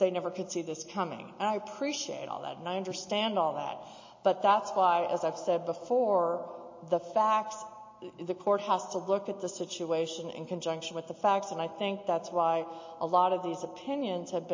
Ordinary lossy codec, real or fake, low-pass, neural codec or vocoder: MP3, 32 kbps; real; 7.2 kHz; none